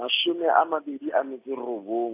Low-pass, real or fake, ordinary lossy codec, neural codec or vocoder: 3.6 kHz; real; AAC, 24 kbps; none